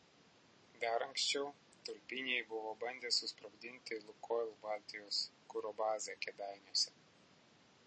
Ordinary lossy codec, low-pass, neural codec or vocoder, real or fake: MP3, 32 kbps; 9.9 kHz; none; real